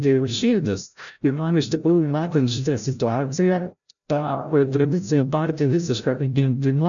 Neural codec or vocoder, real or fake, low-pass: codec, 16 kHz, 0.5 kbps, FreqCodec, larger model; fake; 7.2 kHz